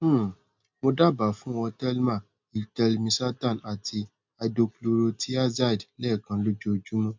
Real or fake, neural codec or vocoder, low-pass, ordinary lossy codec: real; none; 7.2 kHz; MP3, 64 kbps